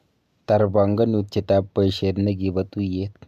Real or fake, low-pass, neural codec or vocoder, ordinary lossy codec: real; 14.4 kHz; none; AAC, 96 kbps